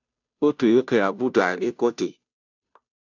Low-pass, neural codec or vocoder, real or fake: 7.2 kHz; codec, 16 kHz, 0.5 kbps, FunCodec, trained on Chinese and English, 25 frames a second; fake